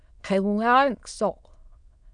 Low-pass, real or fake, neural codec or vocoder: 9.9 kHz; fake; autoencoder, 22.05 kHz, a latent of 192 numbers a frame, VITS, trained on many speakers